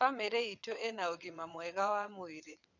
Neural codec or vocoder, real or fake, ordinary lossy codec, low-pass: vocoder, 22.05 kHz, 80 mel bands, WaveNeXt; fake; none; 7.2 kHz